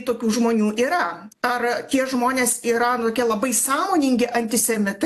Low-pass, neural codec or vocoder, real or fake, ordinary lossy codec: 14.4 kHz; none; real; AAC, 64 kbps